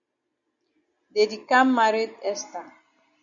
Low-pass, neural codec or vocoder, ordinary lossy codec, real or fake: 7.2 kHz; none; MP3, 96 kbps; real